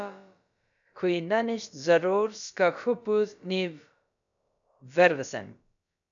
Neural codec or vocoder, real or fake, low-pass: codec, 16 kHz, about 1 kbps, DyCAST, with the encoder's durations; fake; 7.2 kHz